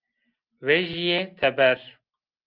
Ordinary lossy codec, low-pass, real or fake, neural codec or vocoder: Opus, 24 kbps; 5.4 kHz; real; none